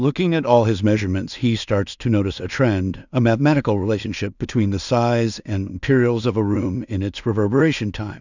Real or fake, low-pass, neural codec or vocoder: fake; 7.2 kHz; codec, 16 kHz in and 24 kHz out, 0.4 kbps, LongCat-Audio-Codec, two codebook decoder